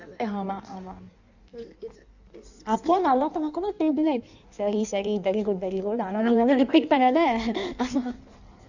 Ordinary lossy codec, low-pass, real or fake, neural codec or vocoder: none; 7.2 kHz; fake; codec, 16 kHz in and 24 kHz out, 1.1 kbps, FireRedTTS-2 codec